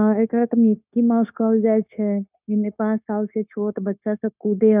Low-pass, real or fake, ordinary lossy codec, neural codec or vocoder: 3.6 kHz; fake; none; codec, 16 kHz, 0.9 kbps, LongCat-Audio-Codec